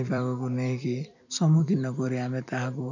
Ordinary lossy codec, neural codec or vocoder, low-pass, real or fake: none; vocoder, 44.1 kHz, 128 mel bands every 256 samples, BigVGAN v2; 7.2 kHz; fake